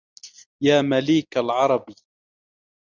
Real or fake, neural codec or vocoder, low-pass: real; none; 7.2 kHz